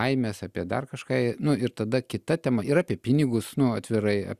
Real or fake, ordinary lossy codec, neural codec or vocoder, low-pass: real; Opus, 64 kbps; none; 14.4 kHz